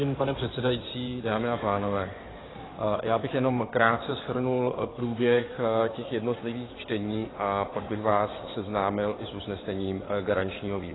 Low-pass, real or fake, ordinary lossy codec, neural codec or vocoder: 7.2 kHz; fake; AAC, 16 kbps; codec, 16 kHz in and 24 kHz out, 2.2 kbps, FireRedTTS-2 codec